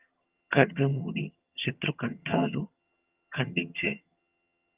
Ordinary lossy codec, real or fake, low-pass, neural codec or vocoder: Opus, 24 kbps; fake; 3.6 kHz; vocoder, 22.05 kHz, 80 mel bands, HiFi-GAN